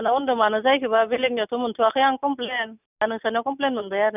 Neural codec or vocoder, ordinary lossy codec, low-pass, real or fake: none; none; 3.6 kHz; real